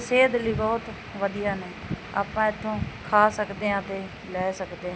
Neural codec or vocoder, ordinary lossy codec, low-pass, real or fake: none; none; none; real